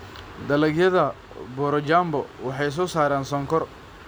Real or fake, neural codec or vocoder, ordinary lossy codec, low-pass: real; none; none; none